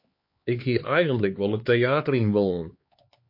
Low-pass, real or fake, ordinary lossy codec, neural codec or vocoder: 5.4 kHz; fake; MP3, 32 kbps; codec, 16 kHz, 4 kbps, X-Codec, HuBERT features, trained on LibriSpeech